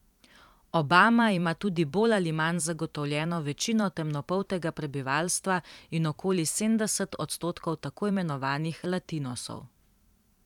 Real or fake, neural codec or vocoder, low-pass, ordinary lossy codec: fake; vocoder, 44.1 kHz, 128 mel bands every 512 samples, BigVGAN v2; 19.8 kHz; none